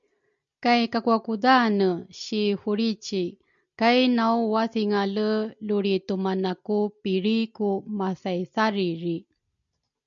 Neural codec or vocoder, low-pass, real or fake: none; 7.2 kHz; real